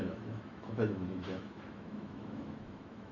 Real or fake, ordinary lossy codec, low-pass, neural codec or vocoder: fake; none; 7.2 kHz; autoencoder, 48 kHz, 128 numbers a frame, DAC-VAE, trained on Japanese speech